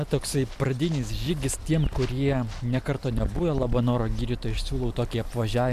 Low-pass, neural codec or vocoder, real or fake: 14.4 kHz; none; real